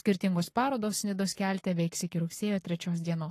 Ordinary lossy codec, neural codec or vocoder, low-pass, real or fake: AAC, 48 kbps; codec, 44.1 kHz, 7.8 kbps, Pupu-Codec; 14.4 kHz; fake